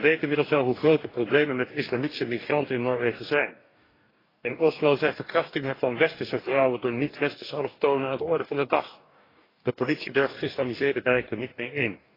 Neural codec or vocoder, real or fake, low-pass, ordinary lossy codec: codec, 44.1 kHz, 2.6 kbps, DAC; fake; 5.4 kHz; AAC, 24 kbps